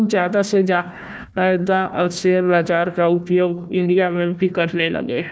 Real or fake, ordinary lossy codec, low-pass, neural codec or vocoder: fake; none; none; codec, 16 kHz, 1 kbps, FunCodec, trained on Chinese and English, 50 frames a second